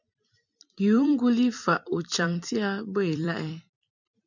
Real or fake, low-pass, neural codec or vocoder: fake; 7.2 kHz; vocoder, 44.1 kHz, 128 mel bands every 256 samples, BigVGAN v2